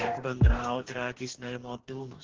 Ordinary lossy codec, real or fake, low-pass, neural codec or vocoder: Opus, 16 kbps; fake; 7.2 kHz; codec, 44.1 kHz, 2.6 kbps, DAC